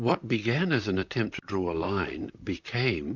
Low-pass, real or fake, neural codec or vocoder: 7.2 kHz; fake; vocoder, 44.1 kHz, 128 mel bands, Pupu-Vocoder